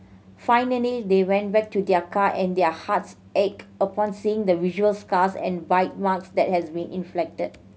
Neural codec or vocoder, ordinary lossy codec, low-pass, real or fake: none; none; none; real